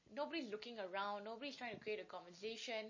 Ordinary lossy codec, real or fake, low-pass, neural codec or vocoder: MP3, 32 kbps; real; 7.2 kHz; none